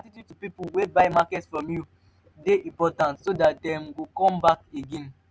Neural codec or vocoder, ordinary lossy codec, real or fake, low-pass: none; none; real; none